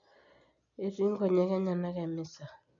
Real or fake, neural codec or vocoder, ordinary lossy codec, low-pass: real; none; none; 7.2 kHz